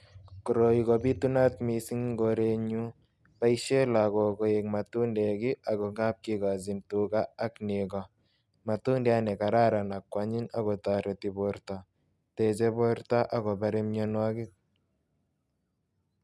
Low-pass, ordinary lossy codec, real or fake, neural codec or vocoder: none; none; real; none